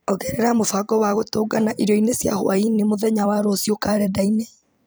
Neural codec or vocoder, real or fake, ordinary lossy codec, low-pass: vocoder, 44.1 kHz, 128 mel bands every 256 samples, BigVGAN v2; fake; none; none